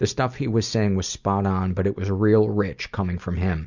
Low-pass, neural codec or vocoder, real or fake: 7.2 kHz; none; real